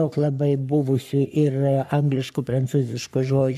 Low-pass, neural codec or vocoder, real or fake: 14.4 kHz; codec, 44.1 kHz, 3.4 kbps, Pupu-Codec; fake